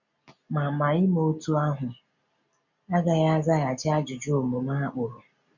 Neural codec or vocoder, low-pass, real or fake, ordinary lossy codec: none; 7.2 kHz; real; none